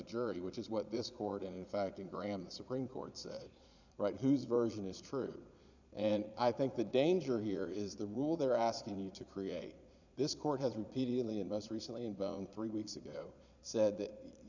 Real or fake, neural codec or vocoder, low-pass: fake; vocoder, 22.05 kHz, 80 mel bands, Vocos; 7.2 kHz